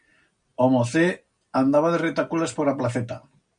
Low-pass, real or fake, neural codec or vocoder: 9.9 kHz; real; none